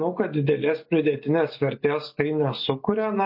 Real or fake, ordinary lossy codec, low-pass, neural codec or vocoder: real; MP3, 32 kbps; 5.4 kHz; none